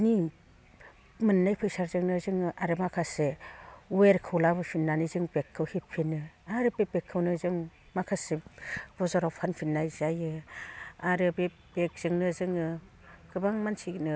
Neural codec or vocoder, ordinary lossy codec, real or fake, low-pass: none; none; real; none